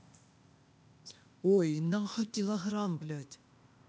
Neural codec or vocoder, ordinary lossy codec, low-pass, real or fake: codec, 16 kHz, 0.8 kbps, ZipCodec; none; none; fake